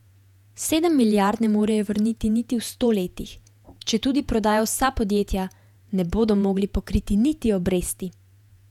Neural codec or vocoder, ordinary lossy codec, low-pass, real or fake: vocoder, 48 kHz, 128 mel bands, Vocos; none; 19.8 kHz; fake